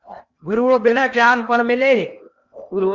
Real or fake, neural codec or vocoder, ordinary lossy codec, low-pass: fake; codec, 16 kHz in and 24 kHz out, 0.8 kbps, FocalCodec, streaming, 65536 codes; Opus, 64 kbps; 7.2 kHz